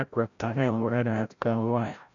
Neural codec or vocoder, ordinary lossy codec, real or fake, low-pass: codec, 16 kHz, 0.5 kbps, FreqCodec, larger model; none; fake; 7.2 kHz